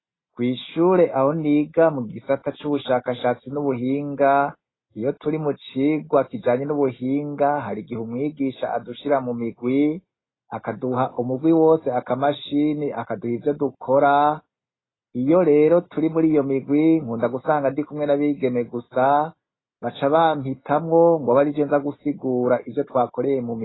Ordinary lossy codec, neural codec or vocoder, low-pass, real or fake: AAC, 16 kbps; none; 7.2 kHz; real